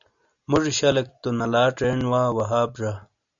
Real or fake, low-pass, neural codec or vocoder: real; 7.2 kHz; none